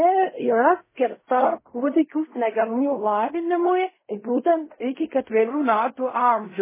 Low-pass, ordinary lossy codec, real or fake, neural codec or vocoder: 3.6 kHz; MP3, 16 kbps; fake; codec, 16 kHz in and 24 kHz out, 0.4 kbps, LongCat-Audio-Codec, fine tuned four codebook decoder